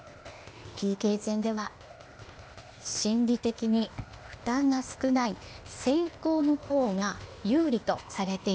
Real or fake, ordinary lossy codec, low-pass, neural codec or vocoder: fake; none; none; codec, 16 kHz, 0.8 kbps, ZipCodec